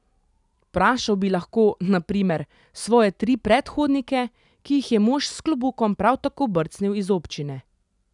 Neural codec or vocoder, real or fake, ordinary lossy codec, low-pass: none; real; none; 10.8 kHz